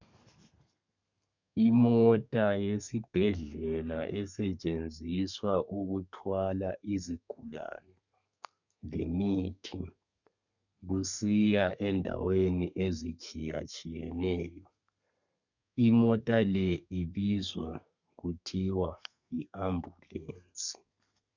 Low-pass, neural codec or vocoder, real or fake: 7.2 kHz; codec, 32 kHz, 1.9 kbps, SNAC; fake